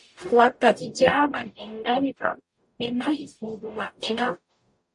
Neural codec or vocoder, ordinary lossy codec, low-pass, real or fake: codec, 44.1 kHz, 0.9 kbps, DAC; MP3, 48 kbps; 10.8 kHz; fake